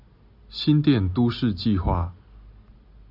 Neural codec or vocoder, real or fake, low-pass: none; real; 5.4 kHz